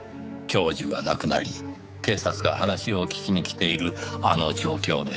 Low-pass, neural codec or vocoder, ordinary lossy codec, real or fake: none; codec, 16 kHz, 4 kbps, X-Codec, HuBERT features, trained on general audio; none; fake